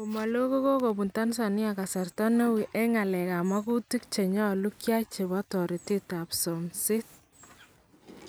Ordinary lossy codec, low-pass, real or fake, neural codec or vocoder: none; none; real; none